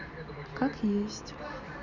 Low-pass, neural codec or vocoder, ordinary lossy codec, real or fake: 7.2 kHz; none; none; real